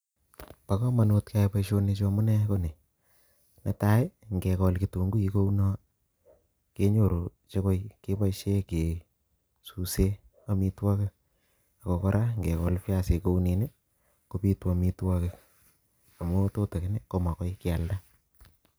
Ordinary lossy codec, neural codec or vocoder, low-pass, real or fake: none; none; none; real